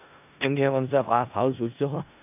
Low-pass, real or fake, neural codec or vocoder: 3.6 kHz; fake; codec, 16 kHz in and 24 kHz out, 0.4 kbps, LongCat-Audio-Codec, four codebook decoder